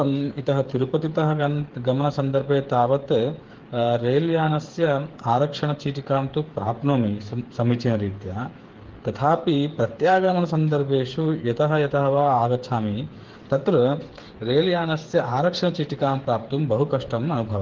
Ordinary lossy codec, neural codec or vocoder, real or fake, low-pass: Opus, 16 kbps; codec, 16 kHz, 8 kbps, FreqCodec, smaller model; fake; 7.2 kHz